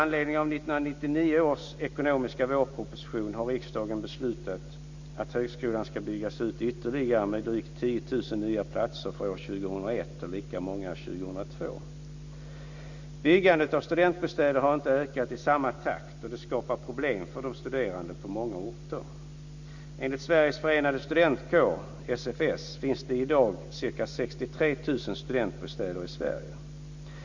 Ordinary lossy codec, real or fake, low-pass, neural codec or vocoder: Opus, 64 kbps; real; 7.2 kHz; none